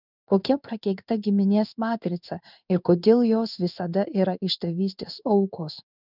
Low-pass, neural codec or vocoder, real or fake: 5.4 kHz; codec, 16 kHz in and 24 kHz out, 1 kbps, XY-Tokenizer; fake